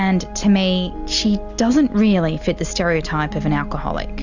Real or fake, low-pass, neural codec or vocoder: real; 7.2 kHz; none